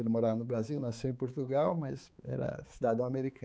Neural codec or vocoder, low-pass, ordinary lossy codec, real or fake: codec, 16 kHz, 4 kbps, X-Codec, HuBERT features, trained on balanced general audio; none; none; fake